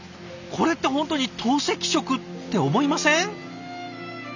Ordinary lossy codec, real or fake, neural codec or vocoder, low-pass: none; real; none; 7.2 kHz